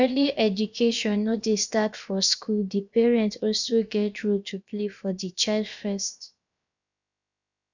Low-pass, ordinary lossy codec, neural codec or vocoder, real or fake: 7.2 kHz; none; codec, 16 kHz, about 1 kbps, DyCAST, with the encoder's durations; fake